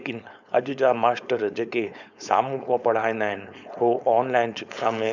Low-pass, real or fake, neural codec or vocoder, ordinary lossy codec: 7.2 kHz; fake; codec, 16 kHz, 4.8 kbps, FACodec; none